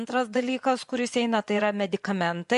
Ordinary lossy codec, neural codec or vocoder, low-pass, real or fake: MP3, 64 kbps; vocoder, 24 kHz, 100 mel bands, Vocos; 10.8 kHz; fake